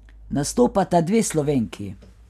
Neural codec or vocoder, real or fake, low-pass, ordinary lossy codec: none; real; 14.4 kHz; none